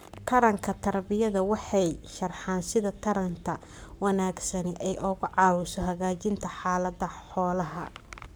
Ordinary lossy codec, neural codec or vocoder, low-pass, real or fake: none; codec, 44.1 kHz, 7.8 kbps, Pupu-Codec; none; fake